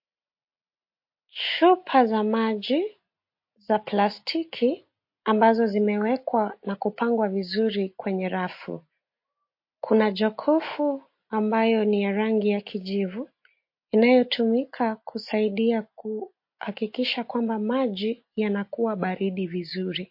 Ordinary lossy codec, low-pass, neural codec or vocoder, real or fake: MP3, 32 kbps; 5.4 kHz; none; real